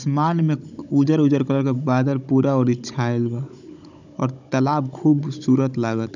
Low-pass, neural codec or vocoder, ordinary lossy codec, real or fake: 7.2 kHz; codec, 16 kHz, 16 kbps, FunCodec, trained on Chinese and English, 50 frames a second; none; fake